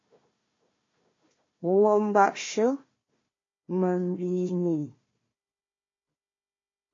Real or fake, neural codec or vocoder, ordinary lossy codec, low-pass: fake; codec, 16 kHz, 1 kbps, FunCodec, trained on Chinese and English, 50 frames a second; AAC, 32 kbps; 7.2 kHz